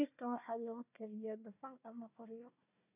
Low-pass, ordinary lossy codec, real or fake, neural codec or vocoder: 3.6 kHz; none; fake; codec, 16 kHz, 1 kbps, FunCodec, trained on Chinese and English, 50 frames a second